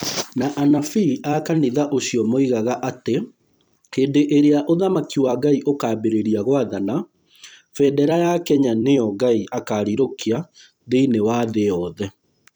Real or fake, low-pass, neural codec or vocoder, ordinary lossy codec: fake; none; vocoder, 44.1 kHz, 128 mel bands every 256 samples, BigVGAN v2; none